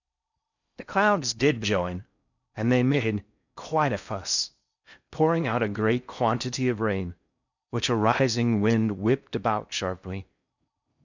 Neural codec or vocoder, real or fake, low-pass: codec, 16 kHz in and 24 kHz out, 0.6 kbps, FocalCodec, streaming, 4096 codes; fake; 7.2 kHz